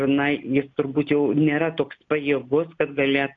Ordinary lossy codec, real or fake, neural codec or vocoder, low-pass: MP3, 64 kbps; real; none; 7.2 kHz